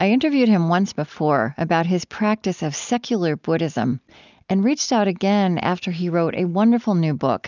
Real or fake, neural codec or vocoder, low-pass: real; none; 7.2 kHz